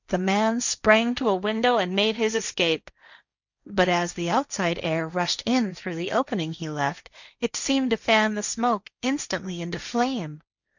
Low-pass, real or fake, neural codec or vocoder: 7.2 kHz; fake; codec, 16 kHz, 1.1 kbps, Voila-Tokenizer